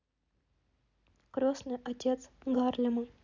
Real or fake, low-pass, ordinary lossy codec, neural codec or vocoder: real; 7.2 kHz; none; none